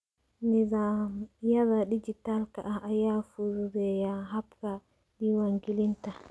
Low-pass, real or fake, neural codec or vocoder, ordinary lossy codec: none; real; none; none